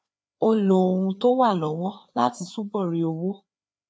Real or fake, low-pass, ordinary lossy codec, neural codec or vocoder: fake; none; none; codec, 16 kHz, 4 kbps, FreqCodec, larger model